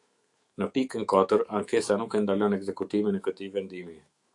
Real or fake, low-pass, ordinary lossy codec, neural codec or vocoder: fake; 10.8 kHz; AAC, 64 kbps; autoencoder, 48 kHz, 128 numbers a frame, DAC-VAE, trained on Japanese speech